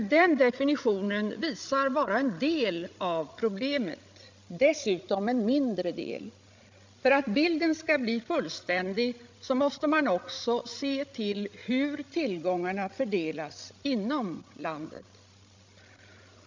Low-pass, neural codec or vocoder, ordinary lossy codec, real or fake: 7.2 kHz; codec, 16 kHz, 16 kbps, FreqCodec, larger model; MP3, 64 kbps; fake